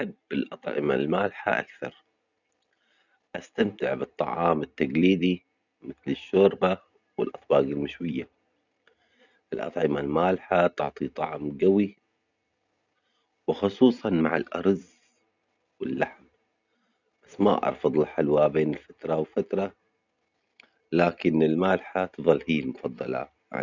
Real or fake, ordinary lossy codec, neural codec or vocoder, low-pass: real; none; none; 7.2 kHz